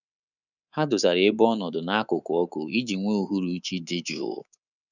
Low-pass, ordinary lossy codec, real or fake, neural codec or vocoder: 7.2 kHz; none; fake; codec, 24 kHz, 3.1 kbps, DualCodec